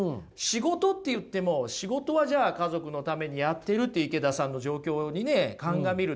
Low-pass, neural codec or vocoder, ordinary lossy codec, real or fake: none; none; none; real